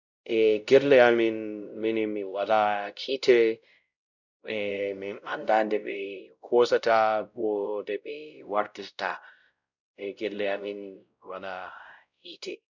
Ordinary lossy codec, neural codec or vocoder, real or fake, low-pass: none; codec, 16 kHz, 0.5 kbps, X-Codec, WavLM features, trained on Multilingual LibriSpeech; fake; 7.2 kHz